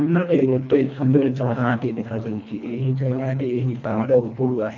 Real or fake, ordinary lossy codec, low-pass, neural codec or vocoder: fake; none; 7.2 kHz; codec, 24 kHz, 1.5 kbps, HILCodec